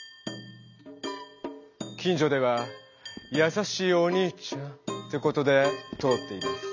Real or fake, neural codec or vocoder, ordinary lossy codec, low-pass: real; none; none; 7.2 kHz